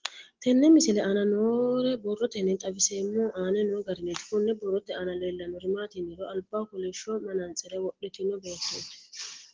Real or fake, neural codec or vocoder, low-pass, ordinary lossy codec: real; none; 7.2 kHz; Opus, 16 kbps